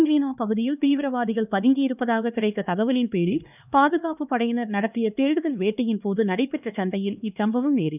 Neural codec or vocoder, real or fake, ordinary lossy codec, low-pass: codec, 16 kHz, 2 kbps, X-Codec, HuBERT features, trained on LibriSpeech; fake; none; 3.6 kHz